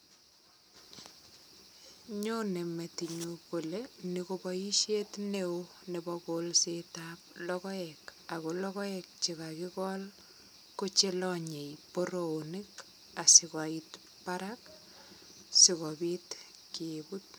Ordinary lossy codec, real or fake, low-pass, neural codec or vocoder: none; real; none; none